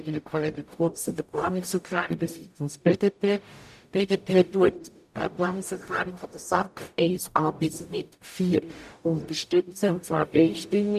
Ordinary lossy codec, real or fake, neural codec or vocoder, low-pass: none; fake; codec, 44.1 kHz, 0.9 kbps, DAC; 14.4 kHz